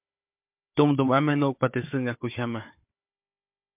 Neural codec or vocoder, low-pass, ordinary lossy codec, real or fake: codec, 16 kHz, 4 kbps, FunCodec, trained on Chinese and English, 50 frames a second; 3.6 kHz; MP3, 32 kbps; fake